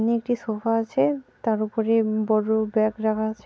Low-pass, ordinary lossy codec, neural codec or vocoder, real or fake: none; none; none; real